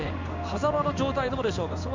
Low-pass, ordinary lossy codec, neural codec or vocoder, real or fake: 7.2 kHz; MP3, 64 kbps; codec, 16 kHz in and 24 kHz out, 1 kbps, XY-Tokenizer; fake